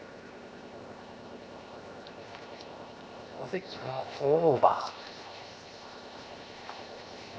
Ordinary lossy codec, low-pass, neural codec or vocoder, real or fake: none; none; codec, 16 kHz, 0.7 kbps, FocalCodec; fake